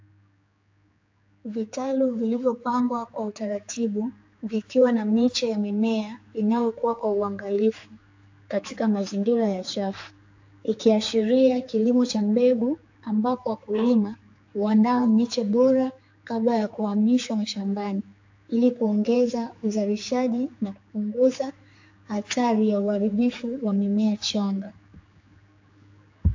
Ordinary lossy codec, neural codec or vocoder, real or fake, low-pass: AAC, 48 kbps; codec, 16 kHz, 4 kbps, X-Codec, HuBERT features, trained on general audio; fake; 7.2 kHz